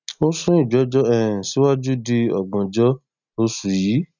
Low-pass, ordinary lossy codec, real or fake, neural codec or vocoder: 7.2 kHz; none; real; none